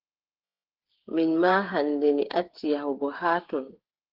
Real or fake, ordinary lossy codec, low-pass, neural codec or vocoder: fake; Opus, 16 kbps; 5.4 kHz; codec, 16 kHz, 8 kbps, FreqCodec, smaller model